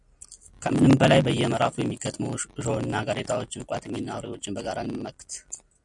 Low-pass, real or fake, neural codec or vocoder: 10.8 kHz; real; none